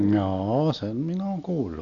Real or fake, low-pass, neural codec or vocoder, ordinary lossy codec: real; 7.2 kHz; none; none